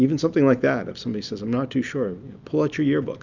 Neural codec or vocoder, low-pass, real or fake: none; 7.2 kHz; real